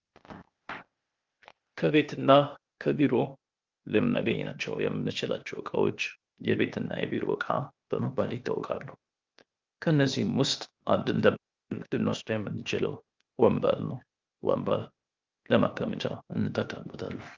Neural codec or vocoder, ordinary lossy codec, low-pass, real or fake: codec, 16 kHz, 0.8 kbps, ZipCodec; Opus, 24 kbps; 7.2 kHz; fake